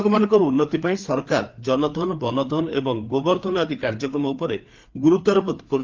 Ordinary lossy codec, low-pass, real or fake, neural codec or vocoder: Opus, 32 kbps; 7.2 kHz; fake; codec, 16 kHz, 4 kbps, FreqCodec, larger model